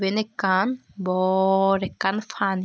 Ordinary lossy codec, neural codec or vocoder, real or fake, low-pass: none; none; real; none